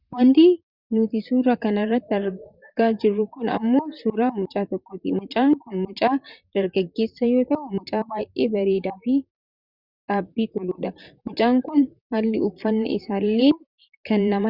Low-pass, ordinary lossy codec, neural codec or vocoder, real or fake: 5.4 kHz; Opus, 64 kbps; vocoder, 44.1 kHz, 128 mel bands, Pupu-Vocoder; fake